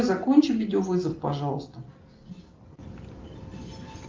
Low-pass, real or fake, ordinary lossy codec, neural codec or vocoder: 7.2 kHz; real; Opus, 24 kbps; none